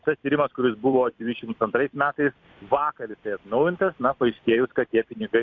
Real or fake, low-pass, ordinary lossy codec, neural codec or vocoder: fake; 7.2 kHz; MP3, 64 kbps; vocoder, 44.1 kHz, 128 mel bands every 256 samples, BigVGAN v2